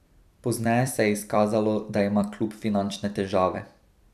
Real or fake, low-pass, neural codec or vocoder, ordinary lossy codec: fake; 14.4 kHz; vocoder, 44.1 kHz, 128 mel bands every 256 samples, BigVGAN v2; none